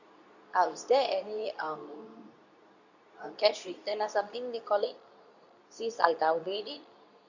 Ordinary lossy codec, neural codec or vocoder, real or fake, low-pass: none; codec, 24 kHz, 0.9 kbps, WavTokenizer, medium speech release version 2; fake; 7.2 kHz